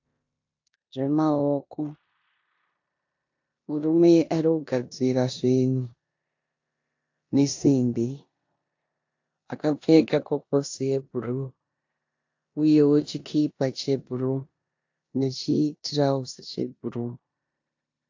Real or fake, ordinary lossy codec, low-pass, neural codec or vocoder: fake; AAC, 48 kbps; 7.2 kHz; codec, 16 kHz in and 24 kHz out, 0.9 kbps, LongCat-Audio-Codec, four codebook decoder